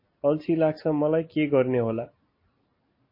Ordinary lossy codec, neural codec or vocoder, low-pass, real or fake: MP3, 24 kbps; none; 5.4 kHz; real